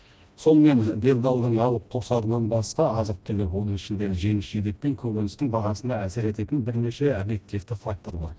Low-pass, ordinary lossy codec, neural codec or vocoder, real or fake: none; none; codec, 16 kHz, 1 kbps, FreqCodec, smaller model; fake